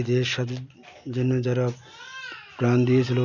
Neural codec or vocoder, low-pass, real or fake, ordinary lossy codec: none; 7.2 kHz; real; none